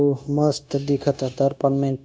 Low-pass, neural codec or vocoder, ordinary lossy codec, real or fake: none; codec, 16 kHz, 0.9 kbps, LongCat-Audio-Codec; none; fake